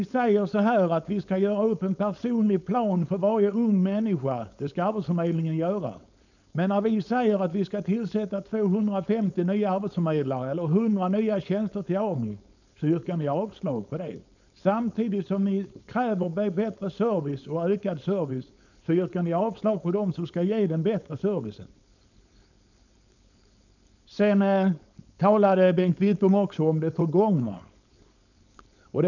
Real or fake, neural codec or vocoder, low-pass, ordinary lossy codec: fake; codec, 16 kHz, 4.8 kbps, FACodec; 7.2 kHz; none